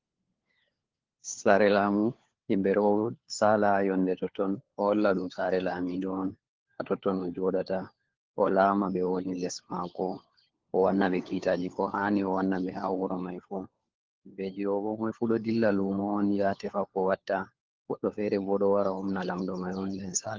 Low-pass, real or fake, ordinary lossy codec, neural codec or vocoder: 7.2 kHz; fake; Opus, 16 kbps; codec, 16 kHz, 4 kbps, FunCodec, trained on LibriTTS, 50 frames a second